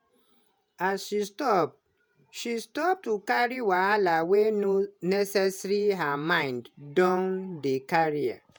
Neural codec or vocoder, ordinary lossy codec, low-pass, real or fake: vocoder, 48 kHz, 128 mel bands, Vocos; none; none; fake